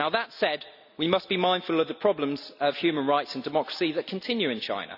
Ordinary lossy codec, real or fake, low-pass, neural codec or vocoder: none; real; 5.4 kHz; none